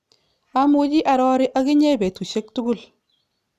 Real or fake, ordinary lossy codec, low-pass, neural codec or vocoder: real; none; 14.4 kHz; none